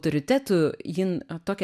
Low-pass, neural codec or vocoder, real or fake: 14.4 kHz; none; real